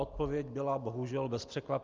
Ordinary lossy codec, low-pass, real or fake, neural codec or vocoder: Opus, 32 kbps; 7.2 kHz; real; none